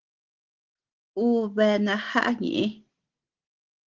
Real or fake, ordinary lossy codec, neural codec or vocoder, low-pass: real; Opus, 16 kbps; none; 7.2 kHz